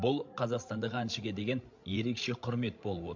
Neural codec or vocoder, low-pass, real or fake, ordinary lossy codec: codec, 16 kHz, 16 kbps, FreqCodec, larger model; 7.2 kHz; fake; MP3, 48 kbps